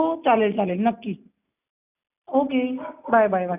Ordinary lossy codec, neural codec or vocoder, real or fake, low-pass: none; none; real; 3.6 kHz